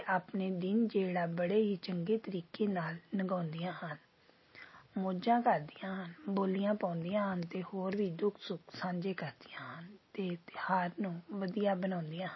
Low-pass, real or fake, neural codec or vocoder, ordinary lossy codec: 7.2 kHz; real; none; MP3, 24 kbps